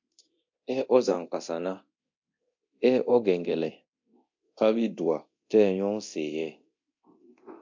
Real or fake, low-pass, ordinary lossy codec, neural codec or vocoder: fake; 7.2 kHz; MP3, 48 kbps; codec, 24 kHz, 0.9 kbps, DualCodec